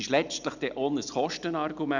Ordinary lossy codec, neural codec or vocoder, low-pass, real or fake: none; none; 7.2 kHz; real